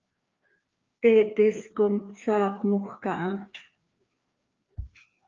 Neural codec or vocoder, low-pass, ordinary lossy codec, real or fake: codec, 16 kHz, 2 kbps, FreqCodec, larger model; 7.2 kHz; Opus, 24 kbps; fake